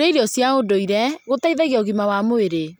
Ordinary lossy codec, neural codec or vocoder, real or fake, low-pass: none; none; real; none